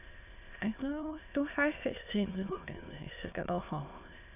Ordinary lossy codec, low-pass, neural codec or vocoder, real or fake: none; 3.6 kHz; autoencoder, 22.05 kHz, a latent of 192 numbers a frame, VITS, trained on many speakers; fake